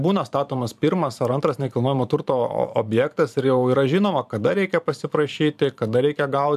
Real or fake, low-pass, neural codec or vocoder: fake; 14.4 kHz; vocoder, 44.1 kHz, 128 mel bands every 512 samples, BigVGAN v2